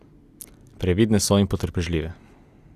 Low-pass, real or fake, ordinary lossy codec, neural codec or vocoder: 14.4 kHz; real; Opus, 64 kbps; none